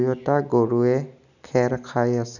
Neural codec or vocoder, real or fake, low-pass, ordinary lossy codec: none; real; 7.2 kHz; none